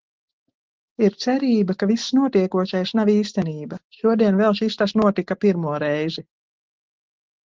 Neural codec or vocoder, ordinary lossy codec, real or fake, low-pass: none; Opus, 16 kbps; real; 7.2 kHz